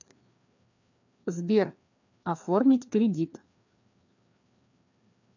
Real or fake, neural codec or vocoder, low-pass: fake; codec, 16 kHz, 2 kbps, FreqCodec, larger model; 7.2 kHz